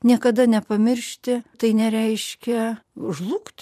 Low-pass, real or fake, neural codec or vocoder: 14.4 kHz; fake; vocoder, 44.1 kHz, 128 mel bands every 512 samples, BigVGAN v2